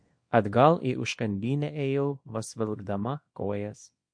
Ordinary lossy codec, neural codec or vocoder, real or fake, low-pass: MP3, 48 kbps; codec, 24 kHz, 0.9 kbps, WavTokenizer, small release; fake; 9.9 kHz